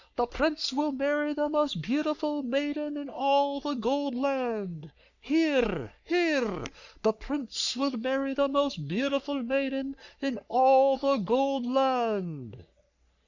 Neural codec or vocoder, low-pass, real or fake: codec, 44.1 kHz, 3.4 kbps, Pupu-Codec; 7.2 kHz; fake